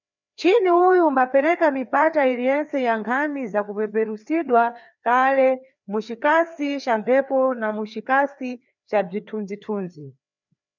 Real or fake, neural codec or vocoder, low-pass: fake; codec, 16 kHz, 2 kbps, FreqCodec, larger model; 7.2 kHz